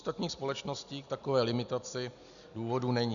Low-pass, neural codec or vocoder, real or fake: 7.2 kHz; none; real